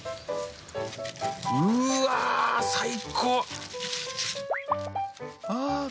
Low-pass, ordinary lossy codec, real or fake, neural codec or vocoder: none; none; real; none